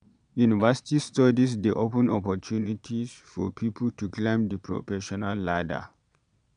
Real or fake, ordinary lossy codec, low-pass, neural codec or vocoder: fake; none; 9.9 kHz; vocoder, 22.05 kHz, 80 mel bands, Vocos